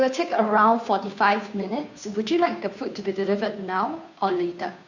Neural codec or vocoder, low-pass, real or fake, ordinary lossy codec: codec, 16 kHz, 2 kbps, FunCodec, trained on Chinese and English, 25 frames a second; 7.2 kHz; fake; none